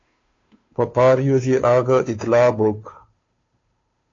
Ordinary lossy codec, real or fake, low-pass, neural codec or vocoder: AAC, 32 kbps; fake; 7.2 kHz; codec, 16 kHz, 2 kbps, FunCodec, trained on Chinese and English, 25 frames a second